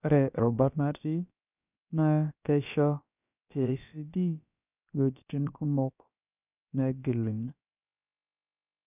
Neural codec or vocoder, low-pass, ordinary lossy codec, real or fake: codec, 16 kHz, about 1 kbps, DyCAST, with the encoder's durations; 3.6 kHz; none; fake